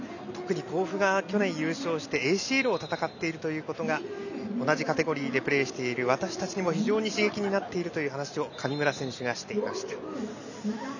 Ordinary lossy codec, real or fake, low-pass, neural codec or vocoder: none; real; 7.2 kHz; none